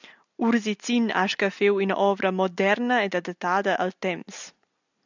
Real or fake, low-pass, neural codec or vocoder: real; 7.2 kHz; none